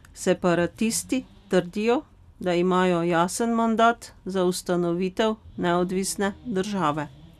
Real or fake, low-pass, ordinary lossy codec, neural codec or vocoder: real; 14.4 kHz; none; none